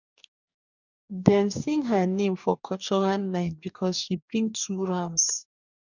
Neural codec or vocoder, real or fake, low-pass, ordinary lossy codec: codec, 44.1 kHz, 2.6 kbps, DAC; fake; 7.2 kHz; none